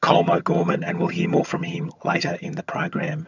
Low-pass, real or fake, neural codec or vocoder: 7.2 kHz; fake; vocoder, 22.05 kHz, 80 mel bands, HiFi-GAN